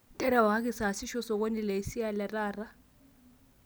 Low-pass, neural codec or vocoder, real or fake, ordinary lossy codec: none; none; real; none